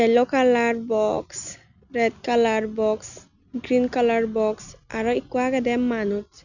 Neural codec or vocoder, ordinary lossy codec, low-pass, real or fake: none; none; 7.2 kHz; real